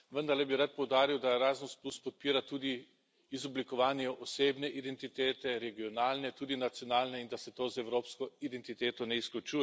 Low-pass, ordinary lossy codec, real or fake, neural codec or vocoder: none; none; real; none